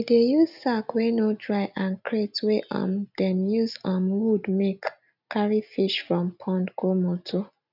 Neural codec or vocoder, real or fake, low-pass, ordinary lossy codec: none; real; 5.4 kHz; none